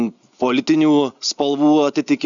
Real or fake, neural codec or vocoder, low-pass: real; none; 7.2 kHz